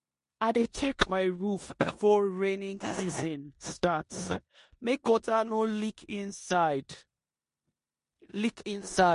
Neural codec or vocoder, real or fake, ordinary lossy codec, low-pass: codec, 16 kHz in and 24 kHz out, 0.9 kbps, LongCat-Audio-Codec, four codebook decoder; fake; MP3, 48 kbps; 10.8 kHz